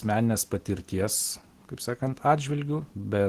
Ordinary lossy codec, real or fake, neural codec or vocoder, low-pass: Opus, 16 kbps; real; none; 14.4 kHz